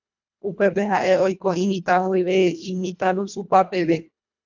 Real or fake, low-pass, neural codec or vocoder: fake; 7.2 kHz; codec, 24 kHz, 1.5 kbps, HILCodec